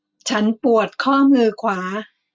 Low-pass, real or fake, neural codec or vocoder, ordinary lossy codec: none; real; none; none